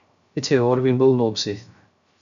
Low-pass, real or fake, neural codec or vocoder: 7.2 kHz; fake; codec, 16 kHz, 0.3 kbps, FocalCodec